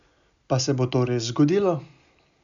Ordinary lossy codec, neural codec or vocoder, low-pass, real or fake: none; none; 7.2 kHz; real